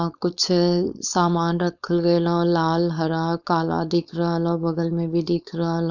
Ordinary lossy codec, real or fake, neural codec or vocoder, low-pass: none; fake; codec, 16 kHz, 4.8 kbps, FACodec; 7.2 kHz